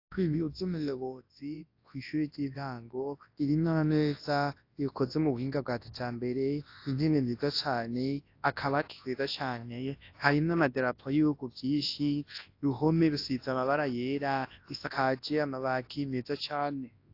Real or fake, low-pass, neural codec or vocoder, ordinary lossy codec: fake; 5.4 kHz; codec, 24 kHz, 0.9 kbps, WavTokenizer, large speech release; AAC, 32 kbps